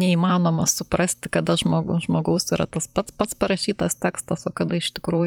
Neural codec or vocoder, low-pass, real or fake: vocoder, 44.1 kHz, 128 mel bands, Pupu-Vocoder; 19.8 kHz; fake